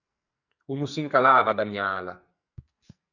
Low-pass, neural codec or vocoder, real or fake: 7.2 kHz; codec, 44.1 kHz, 2.6 kbps, SNAC; fake